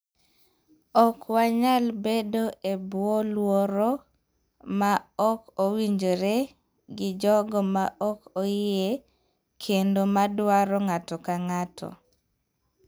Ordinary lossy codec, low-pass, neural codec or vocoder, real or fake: none; none; none; real